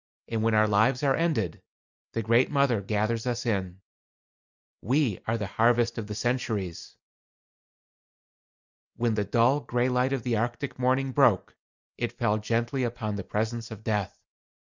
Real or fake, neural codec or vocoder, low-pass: real; none; 7.2 kHz